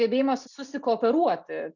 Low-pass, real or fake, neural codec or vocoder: 7.2 kHz; real; none